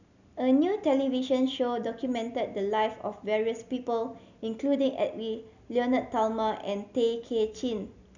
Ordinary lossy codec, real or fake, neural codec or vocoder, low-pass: none; real; none; 7.2 kHz